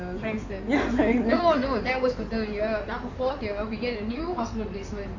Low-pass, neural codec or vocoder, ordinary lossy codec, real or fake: 7.2 kHz; codec, 16 kHz in and 24 kHz out, 2.2 kbps, FireRedTTS-2 codec; none; fake